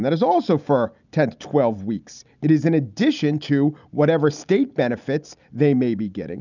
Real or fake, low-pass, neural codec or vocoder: real; 7.2 kHz; none